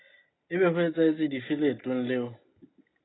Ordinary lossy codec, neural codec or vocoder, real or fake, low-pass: AAC, 16 kbps; none; real; 7.2 kHz